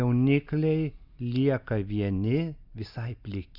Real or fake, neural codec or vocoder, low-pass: real; none; 5.4 kHz